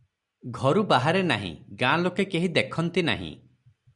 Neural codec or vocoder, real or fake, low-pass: none; real; 10.8 kHz